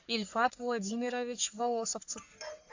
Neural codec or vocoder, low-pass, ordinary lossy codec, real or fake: codec, 44.1 kHz, 3.4 kbps, Pupu-Codec; 7.2 kHz; AAC, 48 kbps; fake